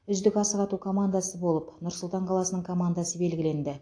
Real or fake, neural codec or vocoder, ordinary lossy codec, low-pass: real; none; AAC, 48 kbps; 9.9 kHz